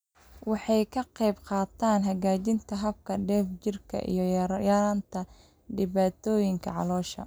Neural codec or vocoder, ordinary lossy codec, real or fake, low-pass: none; none; real; none